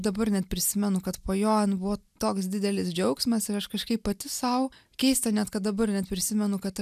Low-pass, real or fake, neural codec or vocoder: 14.4 kHz; real; none